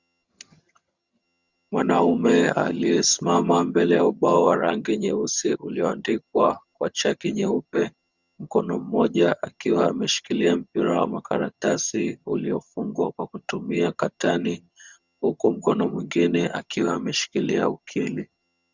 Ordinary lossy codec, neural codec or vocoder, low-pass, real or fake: Opus, 64 kbps; vocoder, 22.05 kHz, 80 mel bands, HiFi-GAN; 7.2 kHz; fake